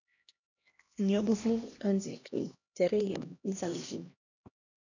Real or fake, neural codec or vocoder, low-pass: fake; codec, 16 kHz, 1 kbps, X-Codec, HuBERT features, trained on LibriSpeech; 7.2 kHz